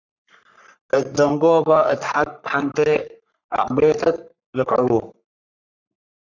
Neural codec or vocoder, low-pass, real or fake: codec, 44.1 kHz, 3.4 kbps, Pupu-Codec; 7.2 kHz; fake